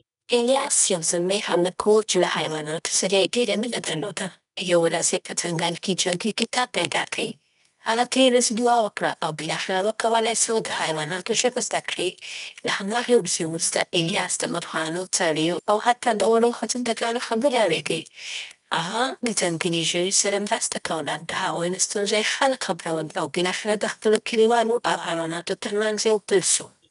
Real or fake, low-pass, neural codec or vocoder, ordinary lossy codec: fake; 10.8 kHz; codec, 24 kHz, 0.9 kbps, WavTokenizer, medium music audio release; none